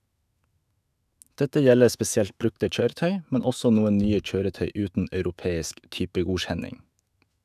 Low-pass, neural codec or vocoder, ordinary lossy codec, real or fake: 14.4 kHz; autoencoder, 48 kHz, 128 numbers a frame, DAC-VAE, trained on Japanese speech; none; fake